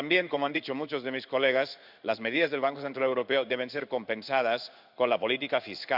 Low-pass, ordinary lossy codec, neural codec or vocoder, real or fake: 5.4 kHz; none; codec, 16 kHz in and 24 kHz out, 1 kbps, XY-Tokenizer; fake